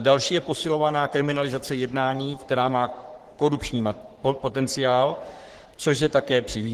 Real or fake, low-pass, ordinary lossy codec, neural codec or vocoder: fake; 14.4 kHz; Opus, 16 kbps; codec, 44.1 kHz, 3.4 kbps, Pupu-Codec